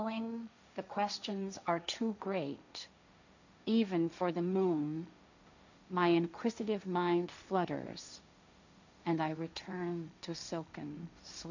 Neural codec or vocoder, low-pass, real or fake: codec, 16 kHz, 1.1 kbps, Voila-Tokenizer; 7.2 kHz; fake